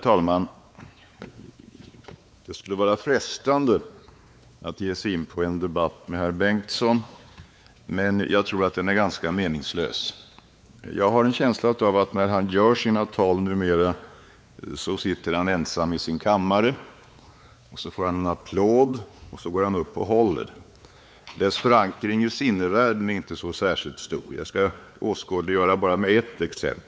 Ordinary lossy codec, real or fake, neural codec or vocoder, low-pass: none; fake; codec, 16 kHz, 4 kbps, X-Codec, WavLM features, trained on Multilingual LibriSpeech; none